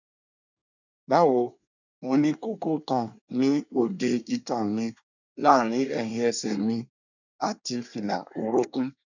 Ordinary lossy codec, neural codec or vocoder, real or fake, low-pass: none; codec, 24 kHz, 1 kbps, SNAC; fake; 7.2 kHz